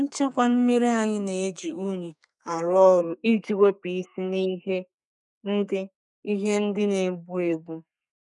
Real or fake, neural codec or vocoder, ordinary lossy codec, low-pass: fake; codec, 32 kHz, 1.9 kbps, SNAC; none; 10.8 kHz